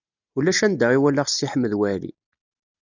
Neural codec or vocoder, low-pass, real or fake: none; 7.2 kHz; real